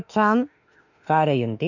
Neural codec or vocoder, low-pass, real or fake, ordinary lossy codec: autoencoder, 48 kHz, 32 numbers a frame, DAC-VAE, trained on Japanese speech; 7.2 kHz; fake; none